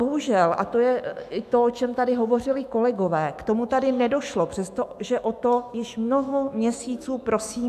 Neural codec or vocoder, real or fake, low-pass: autoencoder, 48 kHz, 128 numbers a frame, DAC-VAE, trained on Japanese speech; fake; 14.4 kHz